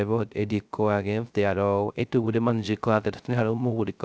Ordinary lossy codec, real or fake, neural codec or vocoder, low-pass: none; fake; codec, 16 kHz, 0.3 kbps, FocalCodec; none